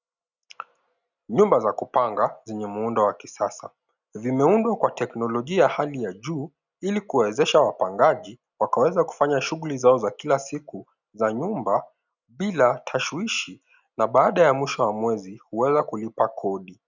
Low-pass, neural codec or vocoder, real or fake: 7.2 kHz; none; real